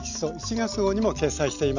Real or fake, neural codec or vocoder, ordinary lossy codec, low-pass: real; none; none; 7.2 kHz